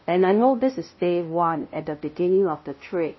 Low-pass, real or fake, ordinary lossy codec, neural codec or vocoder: 7.2 kHz; fake; MP3, 24 kbps; codec, 16 kHz, 0.5 kbps, FunCodec, trained on LibriTTS, 25 frames a second